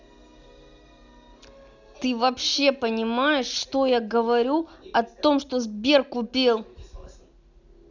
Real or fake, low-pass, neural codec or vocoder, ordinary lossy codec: real; 7.2 kHz; none; none